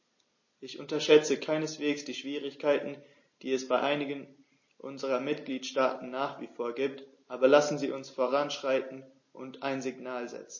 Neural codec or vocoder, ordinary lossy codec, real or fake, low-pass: none; MP3, 32 kbps; real; 7.2 kHz